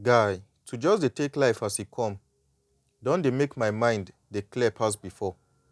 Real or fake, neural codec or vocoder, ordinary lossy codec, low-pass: real; none; none; none